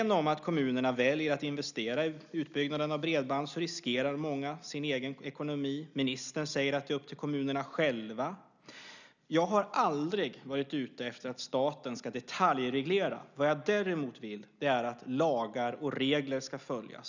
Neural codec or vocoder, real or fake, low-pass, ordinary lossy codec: none; real; 7.2 kHz; none